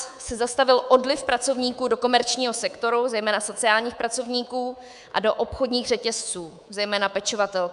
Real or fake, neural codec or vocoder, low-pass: fake; codec, 24 kHz, 3.1 kbps, DualCodec; 10.8 kHz